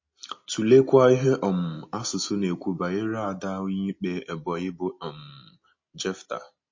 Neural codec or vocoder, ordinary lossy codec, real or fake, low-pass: none; MP3, 32 kbps; real; 7.2 kHz